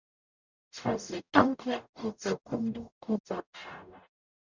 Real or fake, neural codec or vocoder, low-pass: fake; codec, 44.1 kHz, 0.9 kbps, DAC; 7.2 kHz